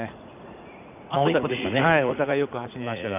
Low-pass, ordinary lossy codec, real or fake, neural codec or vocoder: 3.6 kHz; none; fake; vocoder, 44.1 kHz, 80 mel bands, Vocos